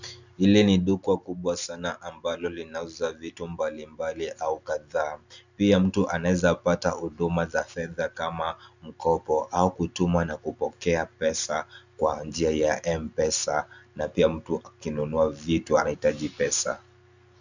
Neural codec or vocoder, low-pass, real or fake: none; 7.2 kHz; real